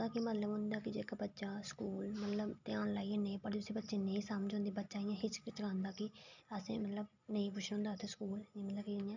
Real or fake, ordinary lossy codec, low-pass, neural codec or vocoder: real; none; 7.2 kHz; none